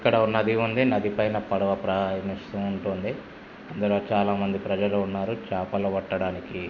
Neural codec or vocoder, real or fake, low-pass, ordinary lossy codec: none; real; 7.2 kHz; none